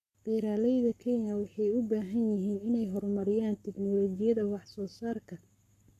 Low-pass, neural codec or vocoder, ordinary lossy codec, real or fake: 14.4 kHz; codec, 44.1 kHz, 7.8 kbps, Pupu-Codec; none; fake